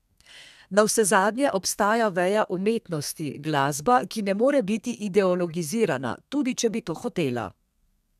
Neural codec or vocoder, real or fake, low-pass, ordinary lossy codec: codec, 32 kHz, 1.9 kbps, SNAC; fake; 14.4 kHz; none